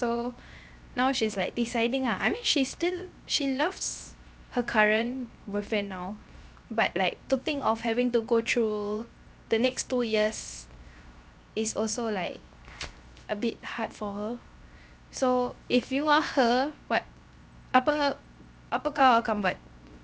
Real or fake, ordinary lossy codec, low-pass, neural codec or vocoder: fake; none; none; codec, 16 kHz, 0.7 kbps, FocalCodec